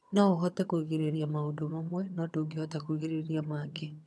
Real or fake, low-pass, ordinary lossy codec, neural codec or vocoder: fake; none; none; vocoder, 22.05 kHz, 80 mel bands, HiFi-GAN